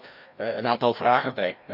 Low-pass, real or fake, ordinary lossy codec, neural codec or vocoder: 5.4 kHz; fake; none; codec, 16 kHz, 1 kbps, FreqCodec, larger model